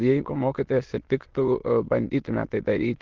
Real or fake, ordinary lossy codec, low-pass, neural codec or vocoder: fake; Opus, 16 kbps; 7.2 kHz; autoencoder, 22.05 kHz, a latent of 192 numbers a frame, VITS, trained on many speakers